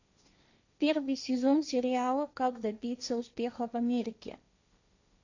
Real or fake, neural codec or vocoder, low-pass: fake; codec, 16 kHz, 1.1 kbps, Voila-Tokenizer; 7.2 kHz